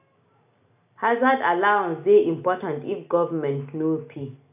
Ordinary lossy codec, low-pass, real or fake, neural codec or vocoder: none; 3.6 kHz; real; none